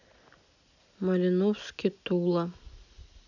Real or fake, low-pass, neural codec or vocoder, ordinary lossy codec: real; 7.2 kHz; none; none